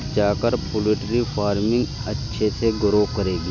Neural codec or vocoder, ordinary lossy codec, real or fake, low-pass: none; none; real; 7.2 kHz